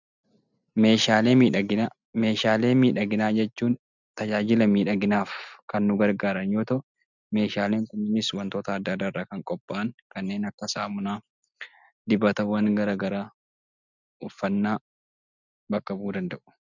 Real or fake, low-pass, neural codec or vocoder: real; 7.2 kHz; none